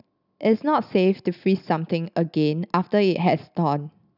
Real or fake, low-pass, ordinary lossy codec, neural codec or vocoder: real; 5.4 kHz; none; none